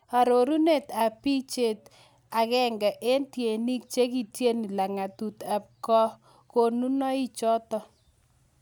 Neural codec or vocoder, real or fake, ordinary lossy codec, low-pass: none; real; none; none